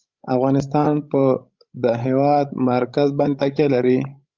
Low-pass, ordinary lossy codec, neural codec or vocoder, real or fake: 7.2 kHz; Opus, 32 kbps; codec, 16 kHz, 16 kbps, FreqCodec, larger model; fake